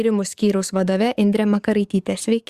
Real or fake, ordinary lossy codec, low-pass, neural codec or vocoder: fake; Opus, 64 kbps; 14.4 kHz; codec, 44.1 kHz, 7.8 kbps, DAC